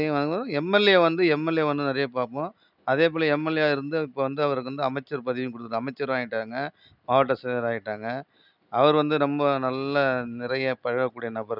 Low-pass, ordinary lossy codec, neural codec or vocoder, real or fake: 5.4 kHz; none; none; real